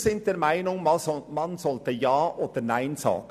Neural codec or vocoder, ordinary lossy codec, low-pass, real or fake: none; none; 14.4 kHz; real